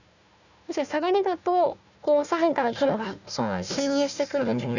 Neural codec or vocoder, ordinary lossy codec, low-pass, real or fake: codec, 16 kHz, 1 kbps, FunCodec, trained on Chinese and English, 50 frames a second; none; 7.2 kHz; fake